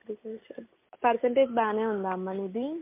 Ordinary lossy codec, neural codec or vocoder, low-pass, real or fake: MP3, 24 kbps; none; 3.6 kHz; real